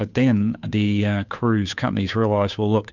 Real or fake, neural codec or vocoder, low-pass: fake; codec, 16 kHz, 2 kbps, FunCodec, trained on Chinese and English, 25 frames a second; 7.2 kHz